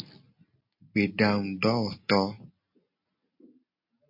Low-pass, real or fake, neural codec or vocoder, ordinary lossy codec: 5.4 kHz; real; none; MP3, 32 kbps